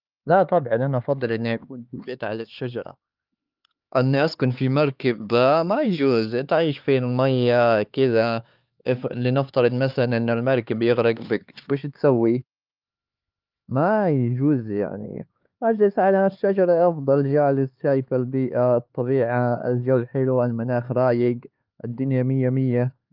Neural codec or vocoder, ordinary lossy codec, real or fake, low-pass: codec, 16 kHz, 4 kbps, X-Codec, HuBERT features, trained on LibriSpeech; Opus, 24 kbps; fake; 5.4 kHz